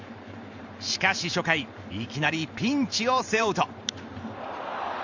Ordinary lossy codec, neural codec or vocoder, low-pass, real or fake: none; vocoder, 44.1 kHz, 128 mel bands every 512 samples, BigVGAN v2; 7.2 kHz; fake